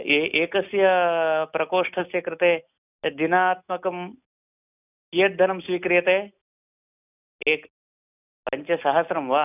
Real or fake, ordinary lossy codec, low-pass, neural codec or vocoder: real; none; 3.6 kHz; none